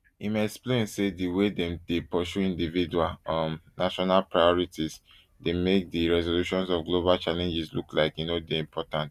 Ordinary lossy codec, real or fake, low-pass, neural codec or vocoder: Opus, 64 kbps; real; 14.4 kHz; none